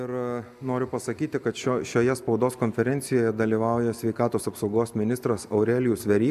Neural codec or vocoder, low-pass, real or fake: none; 14.4 kHz; real